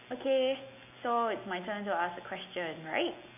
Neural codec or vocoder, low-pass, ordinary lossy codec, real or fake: none; 3.6 kHz; none; real